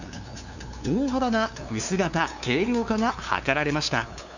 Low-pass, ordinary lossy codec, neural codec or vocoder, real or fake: 7.2 kHz; none; codec, 16 kHz, 2 kbps, FunCodec, trained on LibriTTS, 25 frames a second; fake